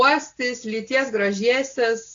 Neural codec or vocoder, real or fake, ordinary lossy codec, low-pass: none; real; AAC, 48 kbps; 7.2 kHz